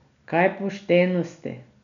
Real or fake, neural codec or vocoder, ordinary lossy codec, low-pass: real; none; none; 7.2 kHz